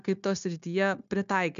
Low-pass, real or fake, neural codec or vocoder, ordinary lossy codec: 7.2 kHz; fake; codec, 16 kHz, 0.9 kbps, LongCat-Audio-Codec; MP3, 64 kbps